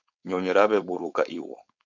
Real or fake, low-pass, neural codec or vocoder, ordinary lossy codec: fake; 7.2 kHz; codec, 16 kHz, 4.8 kbps, FACodec; MP3, 64 kbps